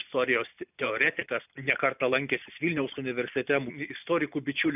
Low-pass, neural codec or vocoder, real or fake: 3.6 kHz; none; real